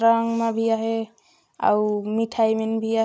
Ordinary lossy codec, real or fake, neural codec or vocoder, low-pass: none; real; none; none